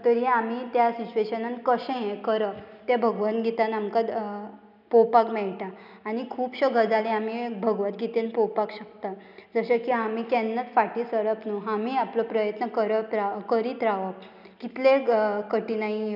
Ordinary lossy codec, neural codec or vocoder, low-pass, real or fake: none; none; 5.4 kHz; real